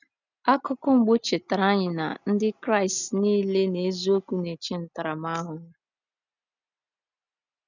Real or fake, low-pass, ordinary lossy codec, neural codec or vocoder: real; 7.2 kHz; none; none